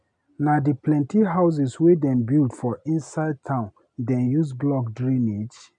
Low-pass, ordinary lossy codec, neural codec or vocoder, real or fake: 9.9 kHz; none; none; real